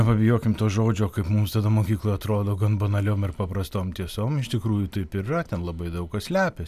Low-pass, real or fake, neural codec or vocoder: 14.4 kHz; fake; vocoder, 44.1 kHz, 128 mel bands every 256 samples, BigVGAN v2